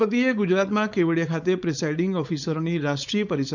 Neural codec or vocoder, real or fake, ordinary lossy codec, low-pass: codec, 16 kHz, 4.8 kbps, FACodec; fake; none; 7.2 kHz